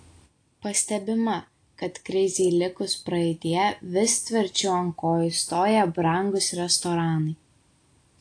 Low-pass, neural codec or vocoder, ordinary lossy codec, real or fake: 9.9 kHz; none; AAC, 48 kbps; real